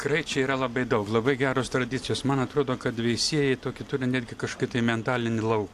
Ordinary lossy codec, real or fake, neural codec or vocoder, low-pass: AAC, 64 kbps; real; none; 14.4 kHz